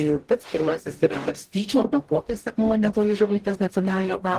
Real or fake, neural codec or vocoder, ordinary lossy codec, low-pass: fake; codec, 44.1 kHz, 0.9 kbps, DAC; Opus, 16 kbps; 14.4 kHz